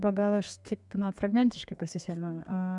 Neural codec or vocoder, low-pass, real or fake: codec, 32 kHz, 1.9 kbps, SNAC; 10.8 kHz; fake